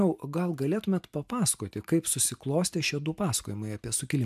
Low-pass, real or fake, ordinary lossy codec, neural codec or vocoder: 14.4 kHz; real; MP3, 96 kbps; none